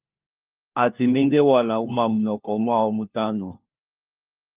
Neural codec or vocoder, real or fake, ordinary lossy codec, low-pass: codec, 16 kHz, 1 kbps, FunCodec, trained on LibriTTS, 50 frames a second; fake; Opus, 32 kbps; 3.6 kHz